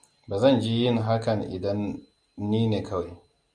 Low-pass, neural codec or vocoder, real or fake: 9.9 kHz; none; real